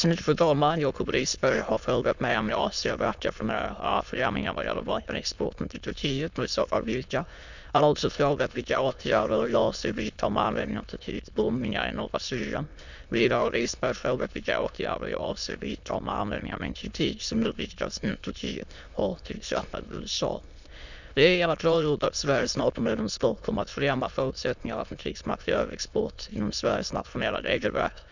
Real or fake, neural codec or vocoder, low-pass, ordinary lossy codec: fake; autoencoder, 22.05 kHz, a latent of 192 numbers a frame, VITS, trained on many speakers; 7.2 kHz; none